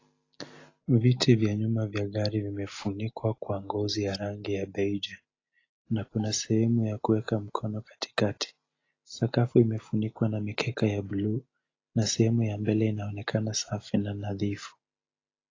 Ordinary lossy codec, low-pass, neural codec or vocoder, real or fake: AAC, 32 kbps; 7.2 kHz; none; real